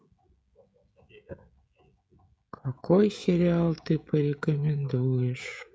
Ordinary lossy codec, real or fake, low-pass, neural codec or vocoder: none; fake; none; codec, 16 kHz, 16 kbps, FreqCodec, smaller model